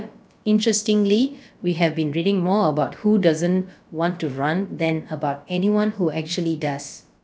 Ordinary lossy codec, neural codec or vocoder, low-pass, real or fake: none; codec, 16 kHz, about 1 kbps, DyCAST, with the encoder's durations; none; fake